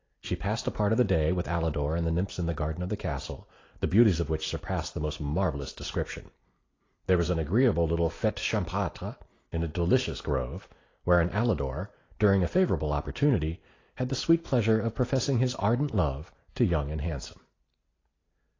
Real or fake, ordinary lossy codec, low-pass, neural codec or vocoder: real; AAC, 32 kbps; 7.2 kHz; none